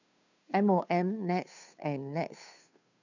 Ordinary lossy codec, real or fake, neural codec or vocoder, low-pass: none; fake; codec, 16 kHz, 2 kbps, FunCodec, trained on Chinese and English, 25 frames a second; 7.2 kHz